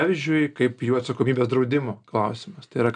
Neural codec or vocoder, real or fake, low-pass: none; real; 9.9 kHz